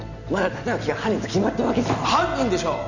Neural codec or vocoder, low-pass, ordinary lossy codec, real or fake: none; 7.2 kHz; none; real